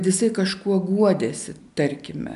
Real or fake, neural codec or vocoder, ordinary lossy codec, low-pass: real; none; MP3, 96 kbps; 10.8 kHz